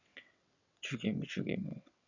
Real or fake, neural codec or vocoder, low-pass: fake; vocoder, 22.05 kHz, 80 mel bands, WaveNeXt; 7.2 kHz